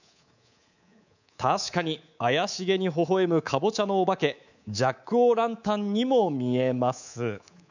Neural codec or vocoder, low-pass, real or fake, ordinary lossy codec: codec, 24 kHz, 3.1 kbps, DualCodec; 7.2 kHz; fake; none